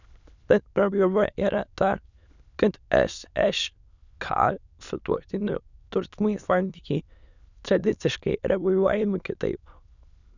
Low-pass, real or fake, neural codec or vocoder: 7.2 kHz; fake; autoencoder, 22.05 kHz, a latent of 192 numbers a frame, VITS, trained on many speakers